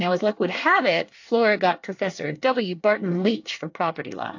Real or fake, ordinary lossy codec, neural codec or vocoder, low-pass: fake; AAC, 48 kbps; codec, 24 kHz, 1 kbps, SNAC; 7.2 kHz